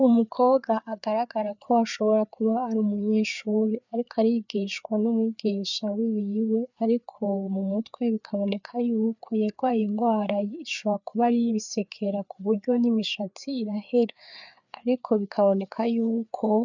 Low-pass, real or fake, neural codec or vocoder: 7.2 kHz; fake; codec, 16 kHz, 4 kbps, FreqCodec, larger model